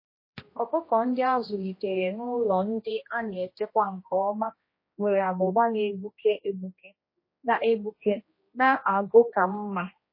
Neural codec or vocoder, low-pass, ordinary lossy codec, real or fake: codec, 16 kHz, 1 kbps, X-Codec, HuBERT features, trained on general audio; 5.4 kHz; MP3, 24 kbps; fake